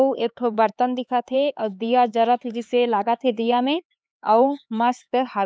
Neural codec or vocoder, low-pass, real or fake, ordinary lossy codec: codec, 16 kHz, 4 kbps, X-Codec, HuBERT features, trained on LibriSpeech; none; fake; none